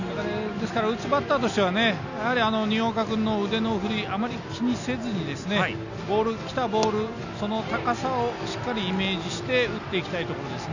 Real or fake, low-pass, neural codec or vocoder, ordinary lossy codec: real; 7.2 kHz; none; none